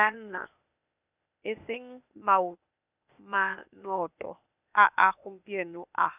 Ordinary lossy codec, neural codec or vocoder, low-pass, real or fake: none; codec, 16 kHz, 0.8 kbps, ZipCodec; 3.6 kHz; fake